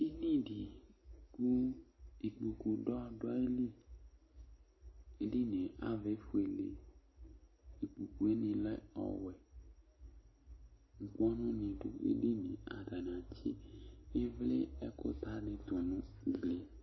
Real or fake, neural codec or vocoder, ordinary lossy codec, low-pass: real; none; MP3, 24 kbps; 7.2 kHz